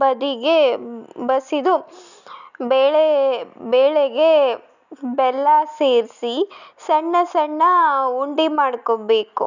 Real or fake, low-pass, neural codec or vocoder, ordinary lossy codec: real; 7.2 kHz; none; none